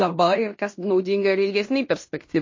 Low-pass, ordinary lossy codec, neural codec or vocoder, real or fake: 7.2 kHz; MP3, 32 kbps; codec, 16 kHz in and 24 kHz out, 0.9 kbps, LongCat-Audio-Codec, fine tuned four codebook decoder; fake